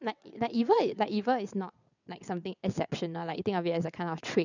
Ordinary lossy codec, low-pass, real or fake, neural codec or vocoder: none; 7.2 kHz; fake; vocoder, 22.05 kHz, 80 mel bands, WaveNeXt